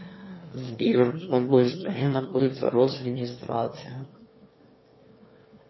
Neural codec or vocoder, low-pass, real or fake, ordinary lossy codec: autoencoder, 22.05 kHz, a latent of 192 numbers a frame, VITS, trained on one speaker; 7.2 kHz; fake; MP3, 24 kbps